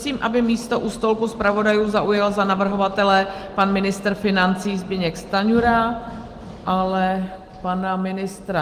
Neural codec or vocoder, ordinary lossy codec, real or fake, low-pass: none; Opus, 24 kbps; real; 14.4 kHz